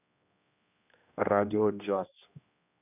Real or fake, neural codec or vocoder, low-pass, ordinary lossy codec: fake; codec, 16 kHz, 1 kbps, X-Codec, HuBERT features, trained on general audio; 3.6 kHz; none